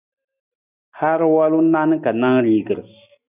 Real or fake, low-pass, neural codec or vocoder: real; 3.6 kHz; none